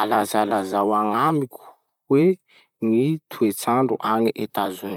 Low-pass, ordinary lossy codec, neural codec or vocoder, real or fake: 19.8 kHz; none; vocoder, 44.1 kHz, 128 mel bands, Pupu-Vocoder; fake